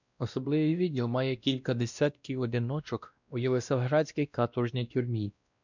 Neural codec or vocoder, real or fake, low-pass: codec, 16 kHz, 1 kbps, X-Codec, WavLM features, trained on Multilingual LibriSpeech; fake; 7.2 kHz